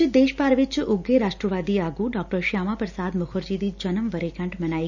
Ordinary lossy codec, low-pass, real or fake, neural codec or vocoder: none; 7.2 kHz; real; none